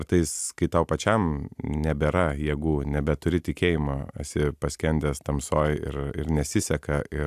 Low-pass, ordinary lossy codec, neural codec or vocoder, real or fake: 14.4 kHz; AAC, 96 kbps; none; real